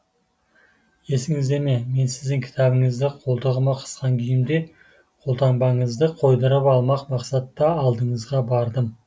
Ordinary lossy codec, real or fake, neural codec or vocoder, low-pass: none; real; none; none